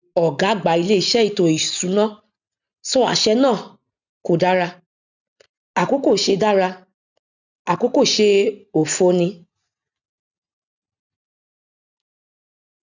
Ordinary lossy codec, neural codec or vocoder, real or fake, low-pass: none; none; real; 7.2 kHz